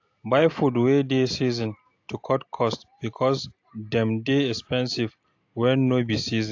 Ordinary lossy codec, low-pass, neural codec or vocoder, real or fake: AAC, 48 kbps; 7.2 kHz; none; real